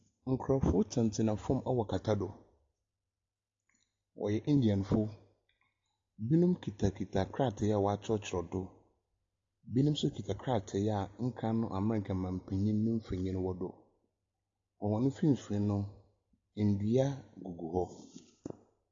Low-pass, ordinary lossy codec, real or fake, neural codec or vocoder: 7.2 kHz; AAC, 48 kbps; real; none